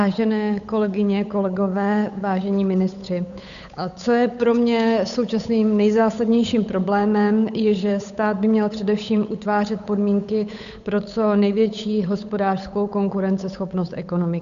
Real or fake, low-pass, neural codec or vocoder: fake; 7.2 kHz; codec, 16 kHz, 8 kbps, FunCodec, trained on Chinese and English, 25 frames a second